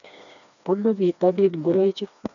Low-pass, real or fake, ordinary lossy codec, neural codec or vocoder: 7.2 kHz; fake; none; codec, 16 kHz, 2 kbps, FreqCodec, smaller model